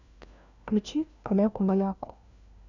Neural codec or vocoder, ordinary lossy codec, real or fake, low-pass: codec, 16 kHz, 1 kbps, FunCodec, trained on LibriTTS, 50 frames a second; none; fake; 7.2 kHz